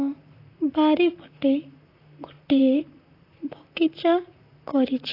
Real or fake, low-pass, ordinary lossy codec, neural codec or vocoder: fake; 5.4 kHz; AAC, 32 kbps; vocoder, 44.1 kHz, 128 mel bands, Pupu-Vocoder